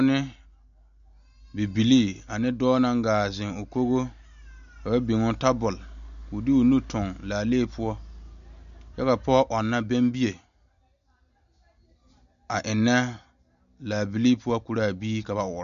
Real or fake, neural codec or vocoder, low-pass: real; none; 7.2 kHz